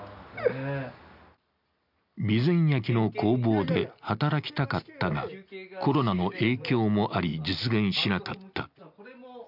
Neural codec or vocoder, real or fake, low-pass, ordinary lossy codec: none; real; 5.4 kHz; none